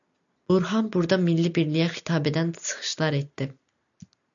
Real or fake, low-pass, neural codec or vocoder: real; 7.2 kHz; none